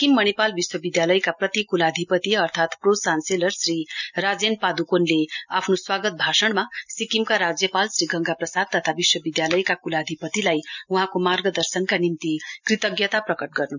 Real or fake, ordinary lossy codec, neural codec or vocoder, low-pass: real; none; none; 7.2 kHz